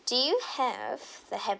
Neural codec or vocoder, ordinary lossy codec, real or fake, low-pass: none; none; real; none